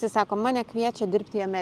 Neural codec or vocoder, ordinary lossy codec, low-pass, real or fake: vocoder, 44.1 kHz, 128 mel bands every 512 samples, BigVGAN v2; Opus, 32 kbps; 14.4 kHz; fake